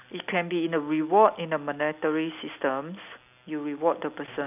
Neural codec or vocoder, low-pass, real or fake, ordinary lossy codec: none; 3.6 kHz; real; none